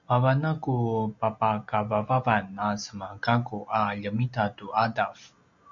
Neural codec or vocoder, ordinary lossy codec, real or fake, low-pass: none; MP3, 64 kbps; real; 7.2 kHz